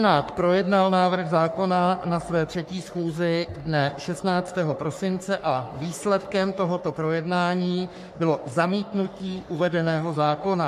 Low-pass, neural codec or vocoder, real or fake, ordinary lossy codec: 14.4 kHz; codec, 44.1 kHz, 3.4 kbps, Pupu-Codec; fake; MP3, 64 kbps